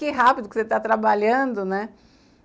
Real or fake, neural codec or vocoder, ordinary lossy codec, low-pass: real; none; none; none